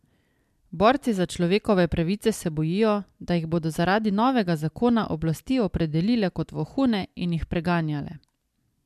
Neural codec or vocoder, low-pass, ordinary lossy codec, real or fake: none; 14.4 kHz; MP3, 96 kbps; real